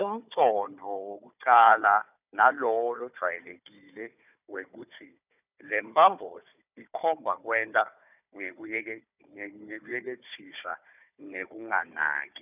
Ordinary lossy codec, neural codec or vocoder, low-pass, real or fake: none; codec, 16 kHz, 4 kbps, FunCodec, trained on Chinese and English, 50 frames a second; 3.6 kHz; fake